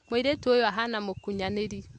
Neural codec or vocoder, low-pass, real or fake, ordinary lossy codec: none; 9.9 kHz; real; AAC, 64 kbps